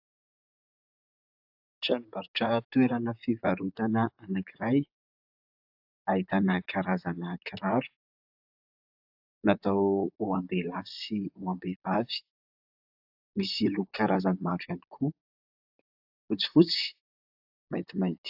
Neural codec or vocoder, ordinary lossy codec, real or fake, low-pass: vocoder, 44.1 kHz, 128 mel bands, Pupu-Vocoder; AAC, 48 kbps; fake; 5.4 kHz